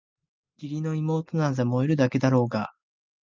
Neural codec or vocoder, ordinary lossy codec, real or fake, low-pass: none; Opus, 24 kbps; real; 7.2 kHz